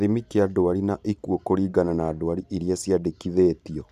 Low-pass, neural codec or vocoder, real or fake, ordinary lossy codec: 14.4 kHz; vocoder, 44.1 kHz, 128 mel bands every 512 samples, BigVGAN v2; fake; none